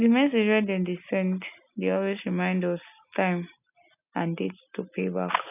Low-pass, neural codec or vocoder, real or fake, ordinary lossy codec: 3.6 kHz; none; real; none